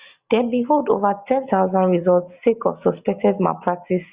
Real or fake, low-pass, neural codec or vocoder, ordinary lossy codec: real; 3.6 kHz; none; Opus, 64 kbps